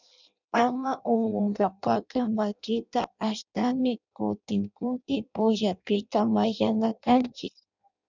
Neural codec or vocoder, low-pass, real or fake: codec, 16 kHz in and 24 kHz out, 0.6 kbps, FireRedTTS-2 codec; 7.2 kHz; fake